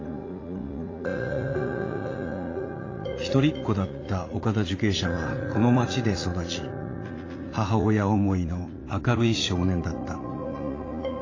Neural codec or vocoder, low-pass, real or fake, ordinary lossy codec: vocoder, 44.1 kHz, 80 mel bands, Vocos; 7.2 kHz; fake; AAC, 32 kbps